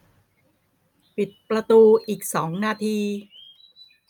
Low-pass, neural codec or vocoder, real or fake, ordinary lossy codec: 19.8 kHz; none; real; none